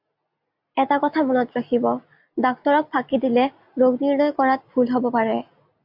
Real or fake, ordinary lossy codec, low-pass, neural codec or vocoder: real; MP3, 48 kbps; 5.4 kHz; none